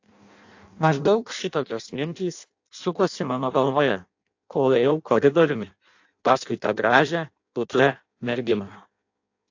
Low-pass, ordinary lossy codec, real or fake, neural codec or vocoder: 7.2 kHz; AAC, 48 kbps; fake; codec, 16 kHz in and 24 kHz out, 0.6 kbps, FireRedTTS-2 codec